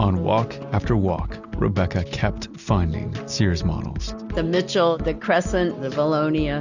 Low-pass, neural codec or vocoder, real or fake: 7.2 kHz; none; real